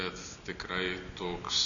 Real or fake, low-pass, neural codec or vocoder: real; 7.2 kHz; none